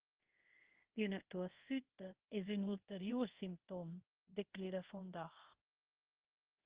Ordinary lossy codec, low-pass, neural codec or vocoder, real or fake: Opus, 16 kbps; 3.6 kHz; codec, 24 kHz, 0.5 kbps, DualCodec; fake